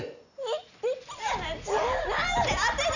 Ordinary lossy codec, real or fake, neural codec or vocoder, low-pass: none; real; none; 7.2 kHz